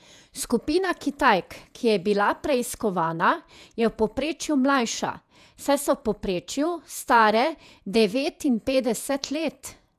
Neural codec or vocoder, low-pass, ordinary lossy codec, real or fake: vocoder, 48 kHz, 128 mel bands, Vocos; 14.4 kHz; none; fake